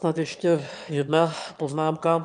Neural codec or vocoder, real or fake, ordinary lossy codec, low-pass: autoencoder, 22.05 kHz, a latent of 192 numbers a frame, VITS, trained on one speaker; fake; MP3, 96 kbps; 9.9 kHz